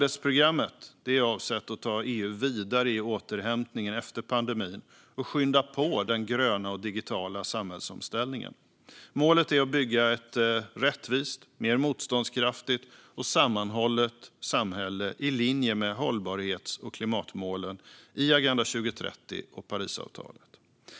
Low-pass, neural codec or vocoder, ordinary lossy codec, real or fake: none; none; none; real